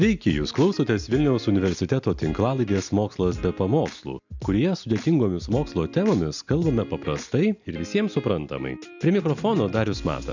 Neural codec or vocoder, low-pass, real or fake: none; 7.2 kHz; real